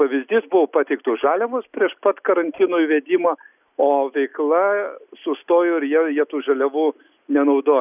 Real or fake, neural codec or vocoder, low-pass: real; none; 3.6 kHz